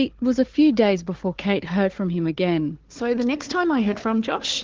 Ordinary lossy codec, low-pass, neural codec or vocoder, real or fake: Opus, 16 kbps; 7.2 kHz; codec, 16 kHz, 4 kbps, FunCodec, trained on Chinese and English, 50 frames a second; fake